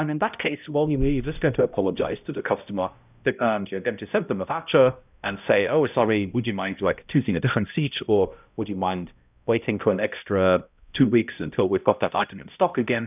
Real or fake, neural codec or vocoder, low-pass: fake; codec, 16 kHz, 0.5 kbps, X-Codec, HuBERT features, trained on balanced general audio; 3.6 kHz